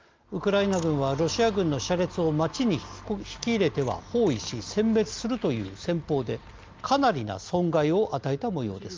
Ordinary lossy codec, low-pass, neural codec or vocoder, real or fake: Opus, 32 kbps; 7.2 kHz; none; real